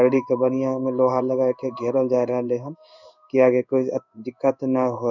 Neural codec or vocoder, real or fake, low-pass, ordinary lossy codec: codec, 16 kHz in and 24 kHz out, 1 kbps, XY-Tokenizer; fake; 7.2 kHz; none